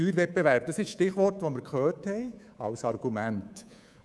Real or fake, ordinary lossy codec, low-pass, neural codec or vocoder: fake; none; none; codec, 24 kHz, 3.1 kbps, DualCodec